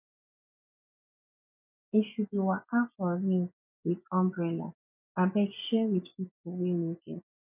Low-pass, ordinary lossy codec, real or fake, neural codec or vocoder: 3.6 kHz; none; fake; vocoder, 24 kHz, 100 mel bands, Vocos